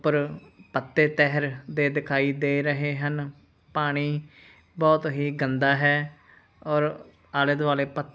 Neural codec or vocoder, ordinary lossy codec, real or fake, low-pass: none; none; real; none